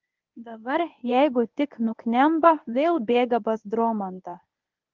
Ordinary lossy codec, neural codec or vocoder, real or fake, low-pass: Opus, 32 kbps; codec, 24 kHz, 0.9 kbps, WavTokenizer, medium speech release version 1; fake; 7.2 kHz